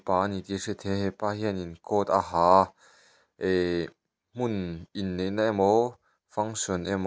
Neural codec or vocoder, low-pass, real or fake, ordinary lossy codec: none; none; real; none